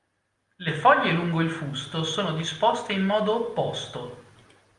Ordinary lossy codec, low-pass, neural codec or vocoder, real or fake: Opus, 32 kbps; 10.8 kHz; none; real